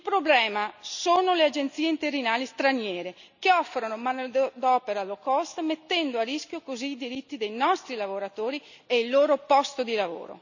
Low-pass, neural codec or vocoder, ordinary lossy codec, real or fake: 7.2 kHz; none; none; real